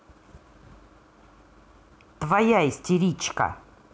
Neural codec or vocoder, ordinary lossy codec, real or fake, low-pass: none; none; real; none